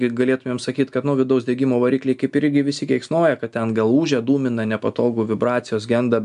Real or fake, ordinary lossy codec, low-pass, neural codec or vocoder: real; AAC, 96 kbps; 10.8 kHz; none